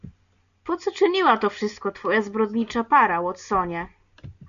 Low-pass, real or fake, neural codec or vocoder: 7.2 kHz; real; none